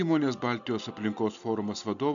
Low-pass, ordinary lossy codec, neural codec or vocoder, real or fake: 7.2 kHz; AAC, 64 kbps; none; real